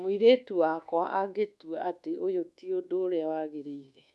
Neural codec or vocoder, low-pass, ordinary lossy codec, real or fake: codec, 24 kHz, 1.2 kbps, DualCodec; none; none; fake